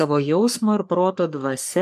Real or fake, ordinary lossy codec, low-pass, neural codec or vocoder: fake; MP3, 96 kbps; 14.4 kHz; codec, 44.1 kHz, 3.4 kbps, Pupu-Codec